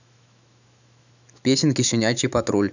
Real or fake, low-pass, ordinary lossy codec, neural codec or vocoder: fake; 7.2 kHz; none; autoencoder, 48 kHz, 128 numbers a frame, DAC-VAE, trained on Japanese speech